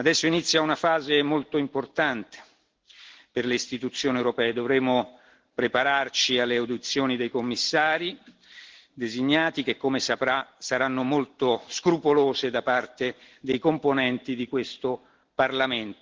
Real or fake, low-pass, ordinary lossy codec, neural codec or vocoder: real; 7.2 kHz; Opus, 16 kbps; none